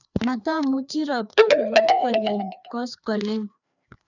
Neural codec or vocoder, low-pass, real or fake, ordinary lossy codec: codec, 16 kHz, 2 kbps, FreqCodec, larger model; 7.2 kHz; fake; none